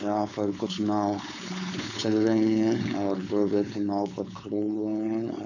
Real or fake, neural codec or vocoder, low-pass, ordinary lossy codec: fake; codec, 16 kHz, 4.8 kbps, FACodec; 7.2 kHz; none